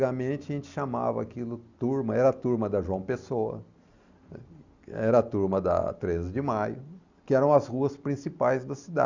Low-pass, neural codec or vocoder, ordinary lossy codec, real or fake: 7.2 kHz; none; Opus, 64 kbps; real